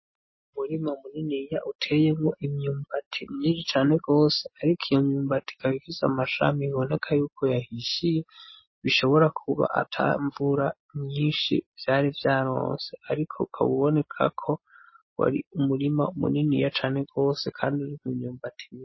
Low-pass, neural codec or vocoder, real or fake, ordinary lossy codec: 7.2 kHz; none; real; MP3, 24 kbps